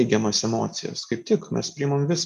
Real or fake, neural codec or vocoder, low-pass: real; none; 14.4 kHz